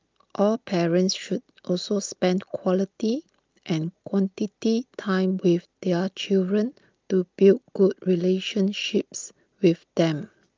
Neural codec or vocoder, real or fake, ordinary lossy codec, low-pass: none; real; Opus, 24 kbps; 7.2 kHz